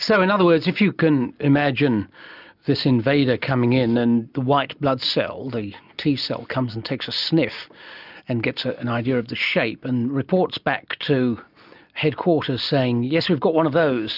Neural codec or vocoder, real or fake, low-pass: none; real; 5.4 kHz